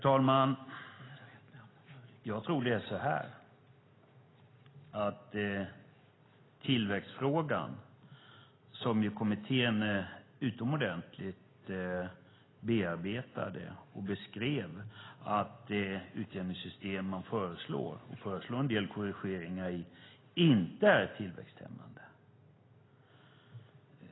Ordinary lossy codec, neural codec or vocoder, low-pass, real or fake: AAC, 16 kbps; none; 7.2 kHz; real